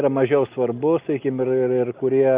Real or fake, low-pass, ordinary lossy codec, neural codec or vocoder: real; 3.6 kHz; Opus, 24 kbps; none